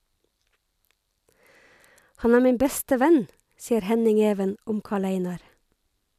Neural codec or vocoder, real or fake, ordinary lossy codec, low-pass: none; real; none; 14.4 kHz